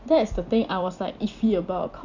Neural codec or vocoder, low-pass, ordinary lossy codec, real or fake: vocoder, 44.1 kHz, 128 mel bands every 256 samples, BigVGAN v2; 7.2 kHz; none; fake